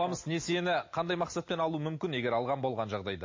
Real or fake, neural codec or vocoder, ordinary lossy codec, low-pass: real; none; MP3, 32 kbps; 7.2 kHz